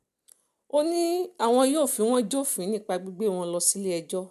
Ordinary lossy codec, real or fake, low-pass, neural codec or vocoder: none; real; 14.4 kHz; none